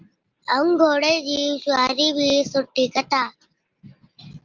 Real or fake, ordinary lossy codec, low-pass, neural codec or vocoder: real; Opus, 24 kbps; 7.2 kHz; none